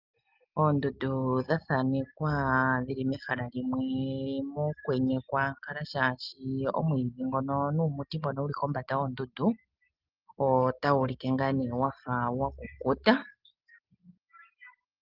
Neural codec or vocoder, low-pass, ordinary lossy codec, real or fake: none; 5.4 kHz; Opus, 32 kbps; real